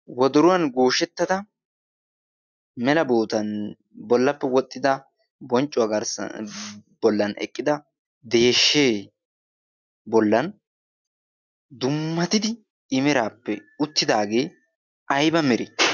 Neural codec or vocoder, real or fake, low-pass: none; real; 7.2 kHz